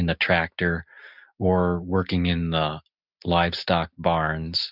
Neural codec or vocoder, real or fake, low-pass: none; real; 5.4 kHz